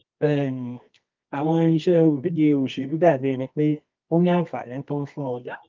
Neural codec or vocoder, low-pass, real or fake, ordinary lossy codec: codec, 24 kHz, 0.9 kbps, WavTokenizer, medium music audio release; 7.2 kHz; fake; Opus, 32 kbps